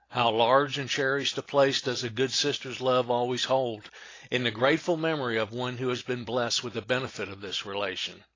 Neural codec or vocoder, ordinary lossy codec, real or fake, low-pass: none; AAC, 32 kbps; real; 7.2 kHz